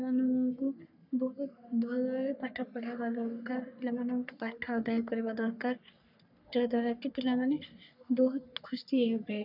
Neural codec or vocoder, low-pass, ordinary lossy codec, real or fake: codec, 44.1 kHz, 3.4 kbps, Pupu-Codec; 5.4 kHz; none; fake